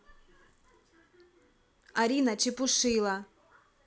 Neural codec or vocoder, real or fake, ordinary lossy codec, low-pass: none; real; none; none